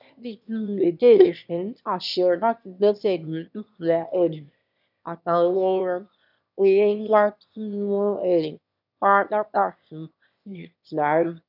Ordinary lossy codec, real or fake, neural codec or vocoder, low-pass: none; fake; autoencoder, 22.05 kHz, a latent of 192 numbers a frame, VITS, trained on one speaker; 5.4 kHz